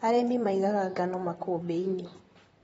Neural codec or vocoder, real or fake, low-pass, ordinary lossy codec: vocoder, 44.1 kHz, 128 mel bands, Pupu-Vocoder; fake; 19.8 kHz; AAC, 24 kbps